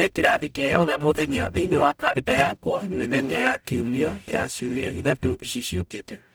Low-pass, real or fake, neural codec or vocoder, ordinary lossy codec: none; fake; codec, 44.1 kHz, 0.9 kbps, DAC; none